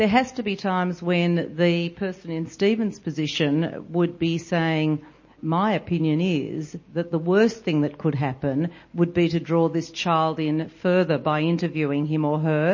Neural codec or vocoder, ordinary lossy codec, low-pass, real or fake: none; MP3, 32 kbps; 7.2 kHz; real